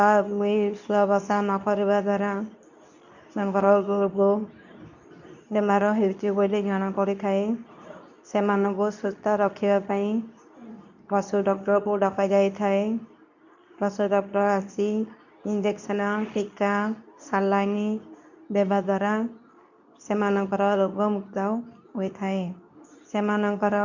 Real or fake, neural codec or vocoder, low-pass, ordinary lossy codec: fake; codec, 24 kHz, 0.9 kbps, WavTokenizer, medium speech release version 2; 7.2 kHz; none